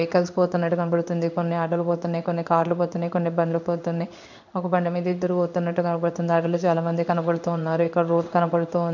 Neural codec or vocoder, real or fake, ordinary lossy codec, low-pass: codec, 16 kHz in and 24 kHz out, 1 kbps, XY-Tokenizer; fake; none; 7.2 kHz